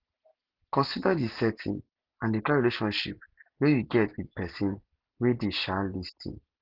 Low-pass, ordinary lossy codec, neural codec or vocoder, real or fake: 5.4 kHz; Opus, 16 kbps; none; real